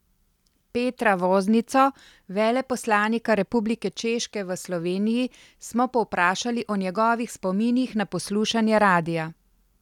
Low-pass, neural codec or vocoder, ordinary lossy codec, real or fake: 19.8 kHz; none; none; real